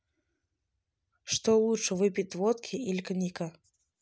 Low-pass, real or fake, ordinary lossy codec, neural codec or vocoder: none; real; none; none